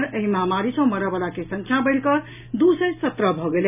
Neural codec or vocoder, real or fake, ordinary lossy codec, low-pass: none; real; none; 3.6 kHz